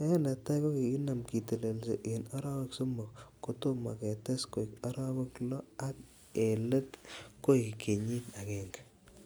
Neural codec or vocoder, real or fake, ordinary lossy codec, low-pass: none; real; none; none